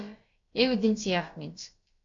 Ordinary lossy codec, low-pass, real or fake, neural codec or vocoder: AAC, 48 kbps; 7.2 kHz; fake; codec, 16 kHz, about 1 kbps, DyCAST, with the encoder's durations